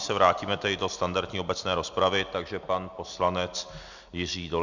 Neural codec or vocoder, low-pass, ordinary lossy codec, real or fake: none; 7.2 kHz; Opus, 64 kbps; real